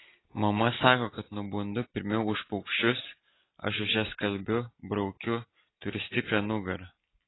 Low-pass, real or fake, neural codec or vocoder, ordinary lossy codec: 7.2 kHz; real; none; AAC, 16 kbps